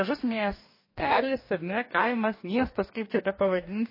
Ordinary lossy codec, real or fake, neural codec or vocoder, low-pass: MP3, 24 kbps; fake; codec, 44.1 kHz, 2.6 kbps, DAC; 5.4 kHz